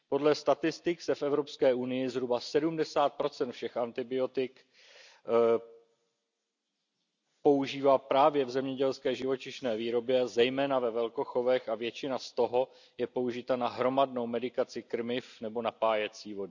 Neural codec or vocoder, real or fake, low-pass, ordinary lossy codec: none; real; 7.2 kHz; none